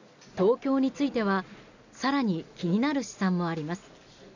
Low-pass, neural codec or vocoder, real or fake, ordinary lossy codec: 7.2 kHz; none; real; none